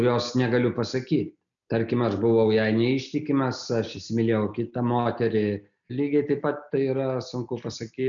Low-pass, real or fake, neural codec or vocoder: 7.2 kHz; real; none